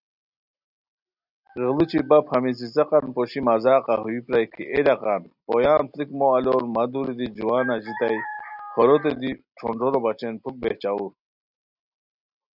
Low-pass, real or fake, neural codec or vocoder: 5.4 kHz; real; none